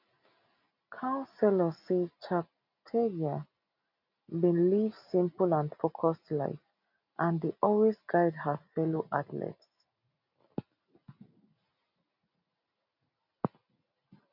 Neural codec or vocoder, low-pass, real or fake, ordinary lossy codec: none; 5.4 kHz; real; none